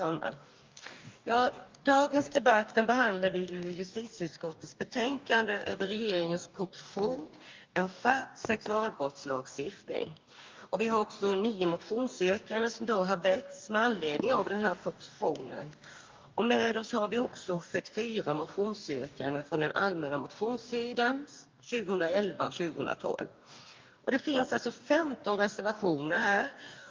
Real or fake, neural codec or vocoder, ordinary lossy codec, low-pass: fake; codec, 44.1 kHz, 2.6 kbps, DAC; Opus, 24 kbps; 7.2 kHz